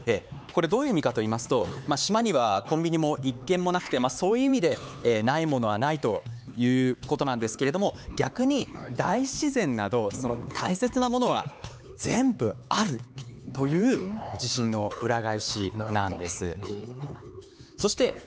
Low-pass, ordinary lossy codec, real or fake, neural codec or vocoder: none; none; fake; codec, 16 kHz, 4 kbps, X-Codec, HuBERT features, trained on LibriSpeech